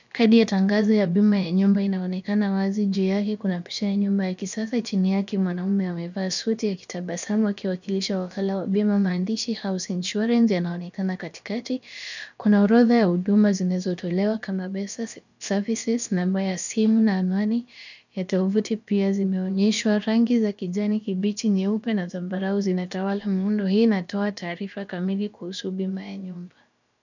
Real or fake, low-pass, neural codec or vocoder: fake; 7.2 kHz; codec, 16 kHz, about 1 kbps, DyCAST, with the encoder's durations